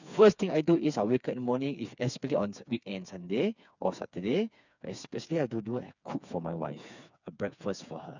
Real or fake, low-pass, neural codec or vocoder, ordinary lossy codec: fake; 7.2 kHz; codec, 16 kHz, 4 kbps, FreqCodec, smaller model; none